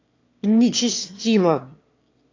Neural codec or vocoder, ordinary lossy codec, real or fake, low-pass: autoencoder, 22.05 kHz, a latent of 192 numbers a frame, VITS, trained on one speaker; AAC, 48 kbps; fake; 7.2 kHz